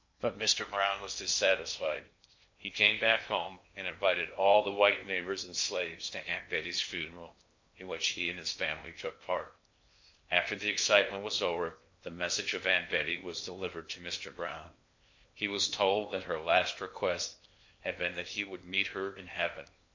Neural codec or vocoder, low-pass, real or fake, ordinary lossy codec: codec, 16 kHz in and 24 kHz out, 0.8 kbps, FocalCodec, streaming, 65536 codes; 7.2 kHz; fake; MP3, 48 kbps